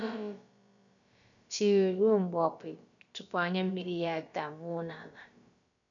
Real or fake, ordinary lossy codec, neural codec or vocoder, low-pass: fake; none; codec, 16 kHz, about 1 kbps, DyCAST, with the encoder's durations; 7.2 kHz